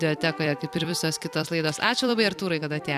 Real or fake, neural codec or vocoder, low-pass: fake; vocoder, 44.1 kHz, 128 mel bands every 256 samples, BigVGAN v2; 14.4 kHz